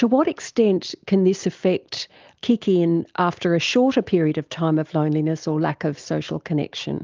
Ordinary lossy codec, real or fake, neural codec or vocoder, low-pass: Opus, 32 kbps; real; none; 7.2 kHz